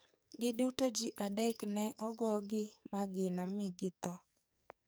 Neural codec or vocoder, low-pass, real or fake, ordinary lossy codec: codec, 44.1 kHz, 2.6 kbps, SNAC; none; fake; none